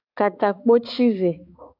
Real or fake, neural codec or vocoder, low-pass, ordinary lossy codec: fake; codec, 16 kHz, 4 kbps, X-Codec, HuBERT features, trained on balanced general audio; 5.4 kHz; AAC, 32 kbps